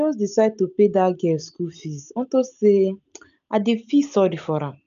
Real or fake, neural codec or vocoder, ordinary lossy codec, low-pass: real; none; none; 7.2 kHz